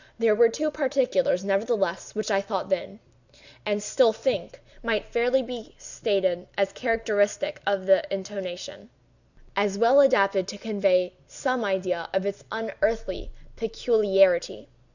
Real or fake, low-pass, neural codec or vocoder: real; 7.2 kHz; none